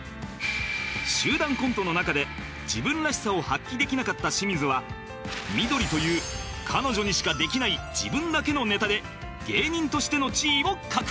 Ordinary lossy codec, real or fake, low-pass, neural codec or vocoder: none; real; none; none